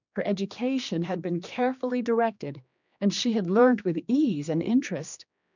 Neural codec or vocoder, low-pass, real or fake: codec, 16 kHz, 2 kbps, X-Codec, HuBERT features, trained on general audio; 7.2 kHz; fake